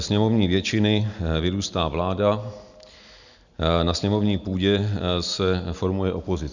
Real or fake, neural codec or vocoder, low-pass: real; none; 7.2 kHz